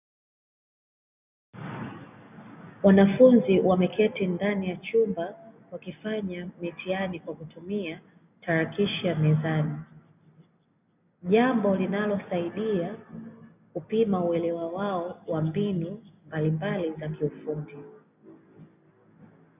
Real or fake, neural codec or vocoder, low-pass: real; none; 3.6 kHz